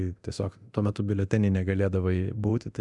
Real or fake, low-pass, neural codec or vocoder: fake; 10.8 kHz; codec, 24 kHz, 0.9 kbps, DualCodec